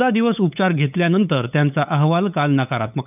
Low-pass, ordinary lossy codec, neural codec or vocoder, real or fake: 3.6 kHz; none; codec, 16 kHz, 8 kbps, FunCodec, trained on LibriTTS, 25 frames a second; fake